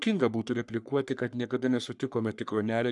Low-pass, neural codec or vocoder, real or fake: 10.8 kHz; codec, 44.1 kHz, 3.4 kbps, Pupu-Codec; fake